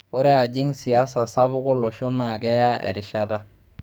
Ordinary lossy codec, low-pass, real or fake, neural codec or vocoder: none; none; fake; codec, 44.1 kHz, 2.6 kbps, SNAC